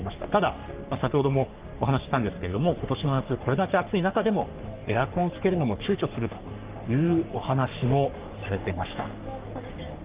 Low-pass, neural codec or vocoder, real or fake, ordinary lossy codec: 3.6 kHz; codec, 44.1 kHz, 3.4 kbps, Pupu-Codec; fake; Opus, 32 kbps